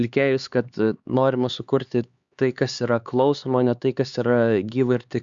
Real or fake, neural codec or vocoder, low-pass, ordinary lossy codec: fake; codec, 16 kHz, 4 kbps, X-Codec, HuBERT features, trained on LibriSpeech; 7.2 kHz; Opus, 64 kbps